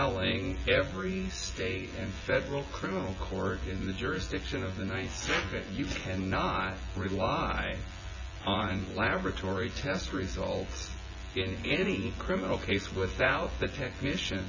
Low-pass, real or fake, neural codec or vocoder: 7.2 kHz; fake; vocoder, 24 kHz, 100 mel bands, Vocos